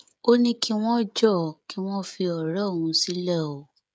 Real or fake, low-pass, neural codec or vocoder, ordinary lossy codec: fake; none; codec, 16 kHz, 16 kbps, FreqCodec, smaller model; none